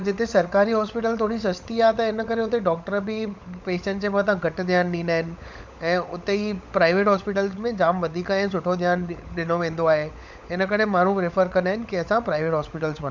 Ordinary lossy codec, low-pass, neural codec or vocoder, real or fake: none; none; codec, 16 kHz, 16 kbps, FunCodec, trained on LibriTTS, 50 frames a second; fake